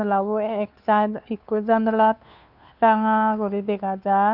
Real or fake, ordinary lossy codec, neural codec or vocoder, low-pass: fake; none; codec, 16 kHz, 2 kbps, FunCodec, trained on Chinese and English, 25 frames a second; 5.4 kHz